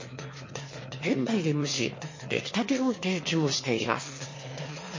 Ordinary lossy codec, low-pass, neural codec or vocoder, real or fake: MP3, 32 kbps; 7.2 kHz; autoencoder, 22.05 kHz, a latent of 192 numbers a frame, VITS, trained on one speaker; fake